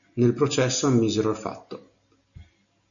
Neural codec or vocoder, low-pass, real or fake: none; 7.2 kHz; real